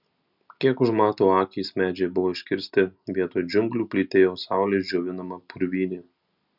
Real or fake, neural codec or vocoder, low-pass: real; none; 5.4 kHz